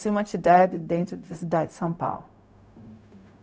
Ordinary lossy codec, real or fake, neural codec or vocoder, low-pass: none; fake; codec, 16 kHz, 0.4 kbps, LongCat-Audio-Codec; none